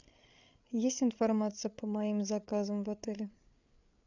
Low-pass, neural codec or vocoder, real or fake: 7.2 kHz; codec, 16 kHz, 8 kbps, FreqCodec, larger model; fake